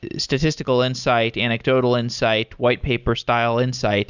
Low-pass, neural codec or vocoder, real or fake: 7.2 kHz; none; real